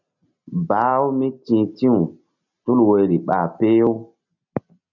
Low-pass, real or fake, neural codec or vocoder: 7.2 kHz; real; none